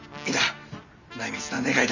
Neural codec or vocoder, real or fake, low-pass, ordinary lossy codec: none; real; 7.2 kHz; none